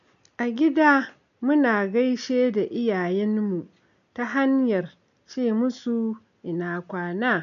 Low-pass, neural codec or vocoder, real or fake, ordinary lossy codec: 7.2 kHz; none; real; none